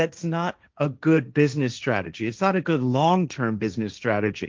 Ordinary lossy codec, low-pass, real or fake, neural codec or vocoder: Opus, 32 kbps; 7.2 kHz; fake; codec, 16 kHz, 1.1 kbps, Voila-Tokenizer